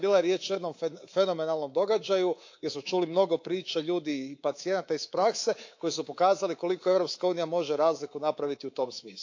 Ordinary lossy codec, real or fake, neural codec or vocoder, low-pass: AAC, 48 kbps; fake; codec, 24 kHz, 3.1 kbps, DualCodec; 7.2 kHz